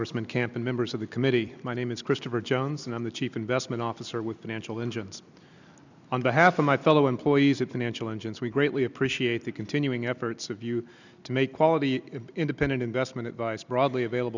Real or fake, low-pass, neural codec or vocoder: real; 7.2 kHz; none